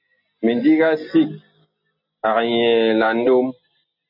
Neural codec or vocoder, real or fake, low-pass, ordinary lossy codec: none; real; 5.4 kHz; MP3, 48 kbps